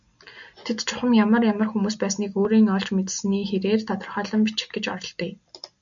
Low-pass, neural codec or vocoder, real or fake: 7.2 kHz; none; real